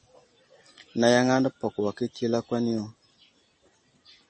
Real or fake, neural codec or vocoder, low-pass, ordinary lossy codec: real; none; 10.8 kHz; MP3, 32 kbps